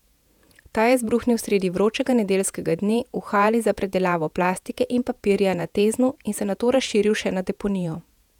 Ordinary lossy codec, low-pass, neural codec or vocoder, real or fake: none; 19.8 kHz; vocoder, 48 kHz, 128 mel bands, Vocos; fake